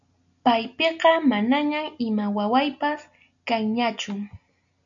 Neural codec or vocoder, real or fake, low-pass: none; real; 7.2 kHz